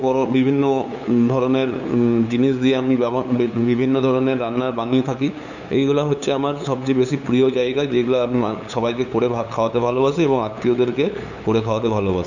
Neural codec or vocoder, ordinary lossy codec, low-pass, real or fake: codec, 16 kHz, 8 kbps, FunCodec, trained on LibriTTS, 25 frames a second; none; 7.2 kHz; fake